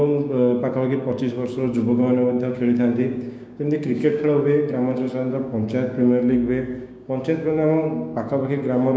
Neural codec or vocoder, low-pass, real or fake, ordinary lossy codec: codec, 16 kHz, 6 kbps, DAC; none; fake; none